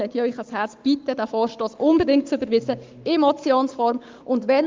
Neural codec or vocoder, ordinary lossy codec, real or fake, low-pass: codec, 44.1 kHz, 7.8 kbps, Pupu-Codec; Opus, 32 kbps; fake; 7.2 kHz